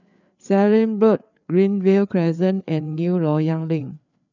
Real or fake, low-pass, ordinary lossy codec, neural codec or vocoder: fake; 7.2 kHz; none; codec, 16 kHz, 4 kbps, FreqCodec, larger model